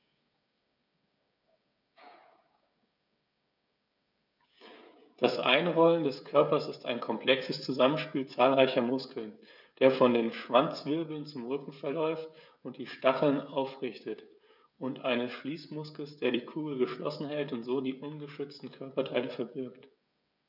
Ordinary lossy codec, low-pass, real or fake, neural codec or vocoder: none; 5.4 kHz; fake; codec, 16 kHz, 16 kbps, FreqCodec, smaller model